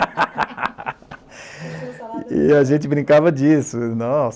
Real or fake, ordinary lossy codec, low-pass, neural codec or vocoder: real; none; none; none